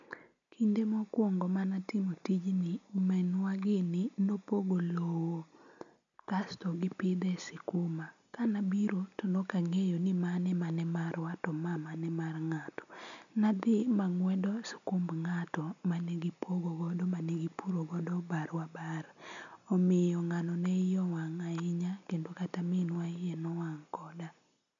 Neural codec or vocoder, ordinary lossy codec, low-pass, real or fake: none; none; 7.2 kHz; real